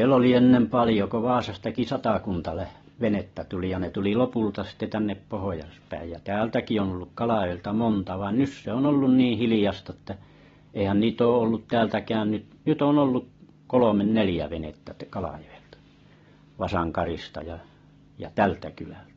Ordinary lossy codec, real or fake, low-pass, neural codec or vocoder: AAC, 24 kbps; real; 7.2 kHz; none